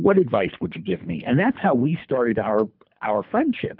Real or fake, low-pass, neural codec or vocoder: fake; 5.4 kHz; codec, 24 kHz, 3 kbps, HILCodec